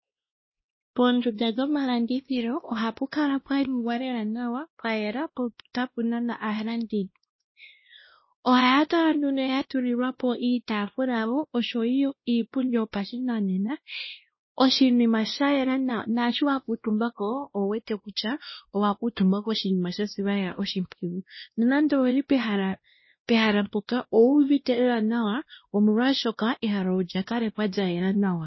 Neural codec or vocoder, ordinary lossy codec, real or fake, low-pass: codec, 16 kHz, 1 kbps, X-Codec, WavLM features, trained on Multilingual LibriSpeech; MP3, 24 kbps; fake; 7.2 kHz